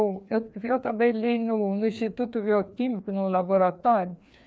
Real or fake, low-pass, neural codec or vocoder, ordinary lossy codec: fake; none; codec, 16 kHz, 2 kbps, FreqCodec, larger model; none